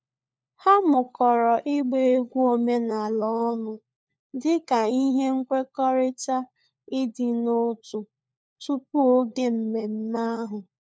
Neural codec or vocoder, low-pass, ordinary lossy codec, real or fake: codec, 16 kHz, 4 kbps, FunCodec, trained on LibriTTS, 50 frames a second; none; none; fake